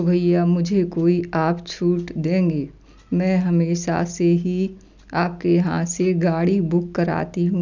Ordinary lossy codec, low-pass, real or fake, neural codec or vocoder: none; 7.2 kHz; real; none